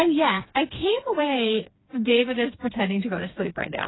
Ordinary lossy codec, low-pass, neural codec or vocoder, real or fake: AAC, 16 kbps; 7.2 kHz; codec, 16 kHz, 2 kbps, FreqCodec, smaller model; fake